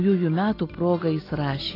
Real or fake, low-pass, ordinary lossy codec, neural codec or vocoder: real; 5.4 kHz; AAC, 24 kbps; none